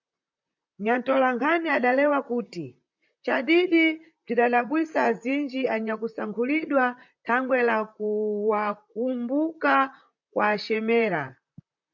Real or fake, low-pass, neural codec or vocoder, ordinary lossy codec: fake; 7.2 kHz; vocoder, 44.1 kHz, 128 mel bands, Pupu-Vocoder; MP3, 64 kbps